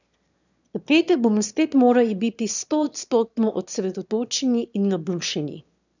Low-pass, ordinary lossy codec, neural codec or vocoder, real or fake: 7.2 kHz; none; autoencoder, 22.05 kHz, a latent of 192 numbers a frame, VITS, trained on one speaker; fake